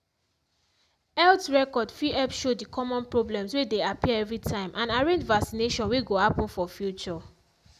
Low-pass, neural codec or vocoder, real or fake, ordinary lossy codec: 14.4 kHz; none; real; none